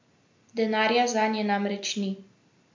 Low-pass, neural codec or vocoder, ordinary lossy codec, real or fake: 7.2 kHz; none; MP3, 48 kbps; real